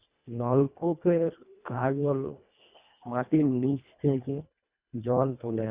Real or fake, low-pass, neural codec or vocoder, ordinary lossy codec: fake; 3.6 kHz; codec, 24 kHz, 1.5 kbps, HILCodec; Opus, 64 kbps